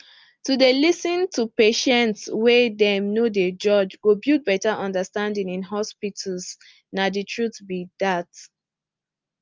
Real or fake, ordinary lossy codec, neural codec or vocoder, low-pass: real; Opus, 24 kbps; none; 7.2 kHz